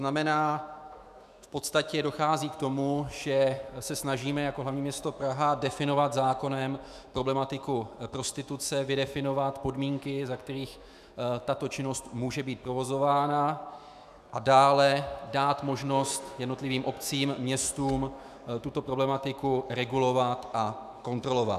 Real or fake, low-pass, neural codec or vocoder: fake; 14.4 kHz; autoencoder, 48 kHz, 128 numbers a frame, DAC-VAE, trained on Japanese speech